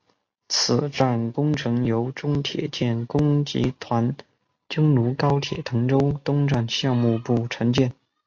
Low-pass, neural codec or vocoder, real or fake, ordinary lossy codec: 7.2 kHz; none; real; AAC, 48 kbps